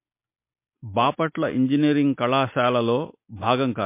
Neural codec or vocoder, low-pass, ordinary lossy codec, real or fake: none; 3.6 kHz; MP3, 24 kbps; real